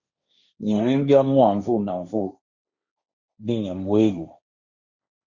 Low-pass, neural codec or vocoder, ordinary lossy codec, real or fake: 7.2 kHz; codec, 16 kHz, 1.1 kbps, Voila-Tokenizer; Opus, 64 kbps; fake